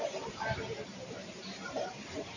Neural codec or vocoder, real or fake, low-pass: vocoder, 44.1 kHz, 128 mel bands every 512 samples, BigVGAN v2; fake; 7.2 kHz